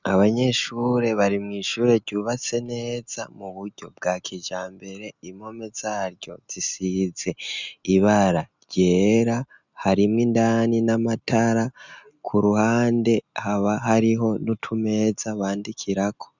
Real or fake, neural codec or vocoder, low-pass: real; none; 7.2 kHz